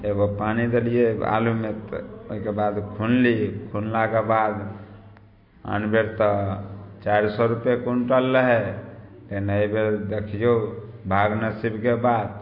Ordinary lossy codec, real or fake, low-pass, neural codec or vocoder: MP3, 32 kbps; real; 5.4 kHz; none